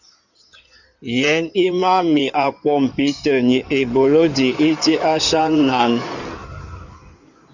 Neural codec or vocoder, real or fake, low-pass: codec, 16 kHz in and 24 kHz out, 2.2 kbps, FireRedTTS-2 codec; fake; 7.2 kHz